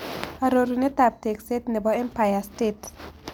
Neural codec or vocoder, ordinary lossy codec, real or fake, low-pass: none; none; real; none